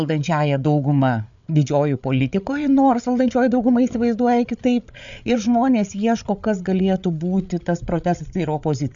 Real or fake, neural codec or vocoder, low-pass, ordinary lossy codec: fake; codec, 16 kHz, 8 kbps, FreqCodec, larger model; 7.2 kHz; MP3, 64 kbps